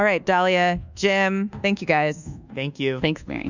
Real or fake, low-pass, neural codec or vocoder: fake; 7.2 kHz; codec, 24 kHz, 1.2 kbps, DualCodec